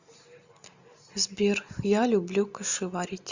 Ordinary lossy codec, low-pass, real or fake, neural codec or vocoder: Opus, 64 kbps; 7.2 kHz; real; none